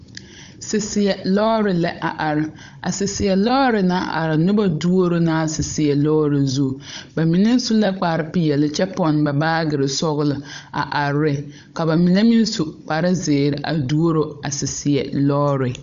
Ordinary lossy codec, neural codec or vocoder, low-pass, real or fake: MP3, 64 kbps; codec, 16 kHz, 16 kbps, FunCodec, trained on Chinese and English, 50 frames a second; 7.2 kHz; fake